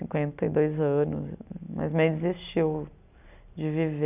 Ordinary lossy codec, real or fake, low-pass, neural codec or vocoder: none; real; 3.6 kHz; none